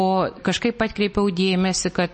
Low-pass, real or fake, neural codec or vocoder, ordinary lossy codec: 10.8 kHz; real; none; MP3, 32 kbps